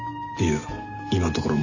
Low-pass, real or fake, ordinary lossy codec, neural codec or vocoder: 7.2 kHz; real; none; none